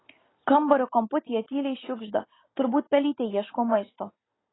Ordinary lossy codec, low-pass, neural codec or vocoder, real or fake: AAC, 16 kbps; 7.2 kHz; none; real